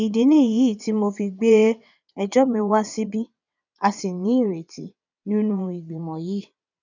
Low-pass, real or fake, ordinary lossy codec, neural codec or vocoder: 7.2 kHz; fake; none; vocoder, 22.05 kHz, 80 mel bands, WaveNeXt